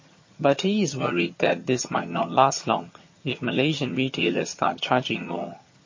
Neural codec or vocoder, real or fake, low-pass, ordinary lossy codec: vocoder, 22.05 kHz, 80 mel bands, HiFi-GAN; fake; 7.2 kHz; MP3, 32 kbps